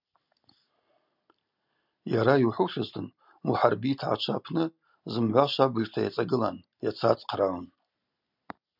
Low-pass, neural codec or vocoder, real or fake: 5.4 kHz; none; real